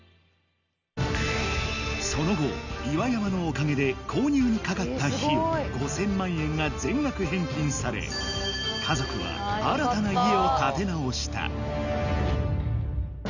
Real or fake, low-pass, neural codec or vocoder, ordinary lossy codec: real; 7.2 kHz; none; none